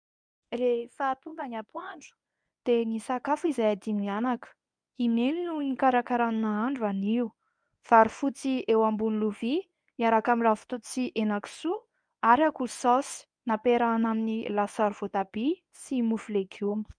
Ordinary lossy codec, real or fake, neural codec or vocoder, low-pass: MP3, 96 kbps; fake; codec, 24 kHz, 0.9 kbps, WavTokenizer, medium speech release version 1; 9.9 kHz